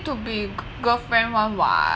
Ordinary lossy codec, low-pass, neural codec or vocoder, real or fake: none; none; none; real